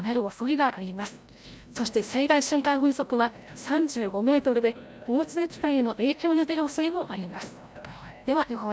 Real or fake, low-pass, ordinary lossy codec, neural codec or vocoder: fake; none; none; codec, 16 kHz, 0.5 kbps, FreqCodec, larger model